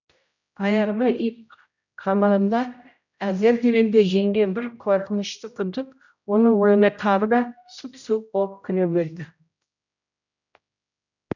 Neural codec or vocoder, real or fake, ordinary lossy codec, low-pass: codec, 16 kHz, 0.5 kbps, X-Codec, HuBERT features, trained on general audio; fake; none; 7.2 kHz